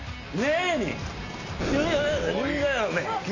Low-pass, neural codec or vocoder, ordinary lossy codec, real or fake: 7.2 kHz; none; none; real